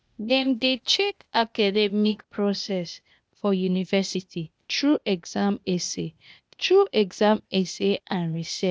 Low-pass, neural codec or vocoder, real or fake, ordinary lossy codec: none; codec, 16 kHz, 0.8 kbps, ZipCodec; fake; none